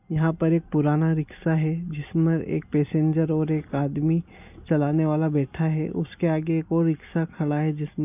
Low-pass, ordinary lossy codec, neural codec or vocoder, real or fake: 3.6 kHz; none; none; real